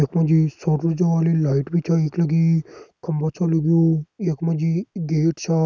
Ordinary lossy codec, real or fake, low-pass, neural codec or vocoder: none; real; none; none